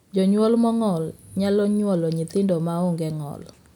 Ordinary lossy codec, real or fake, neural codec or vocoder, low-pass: none; real; none; 19.8 kHz